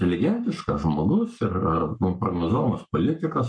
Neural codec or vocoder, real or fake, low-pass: codec, 44.1 kHz, 7.8 kbps, Pupu-Codec; fake; 9.9 kHz